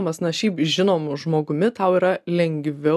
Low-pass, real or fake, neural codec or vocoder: 14.4 kHz; real; none